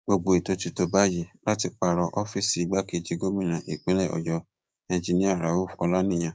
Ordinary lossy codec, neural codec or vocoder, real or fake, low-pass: none; codec, 16 kHz, 6 kbps, DAC; fake; none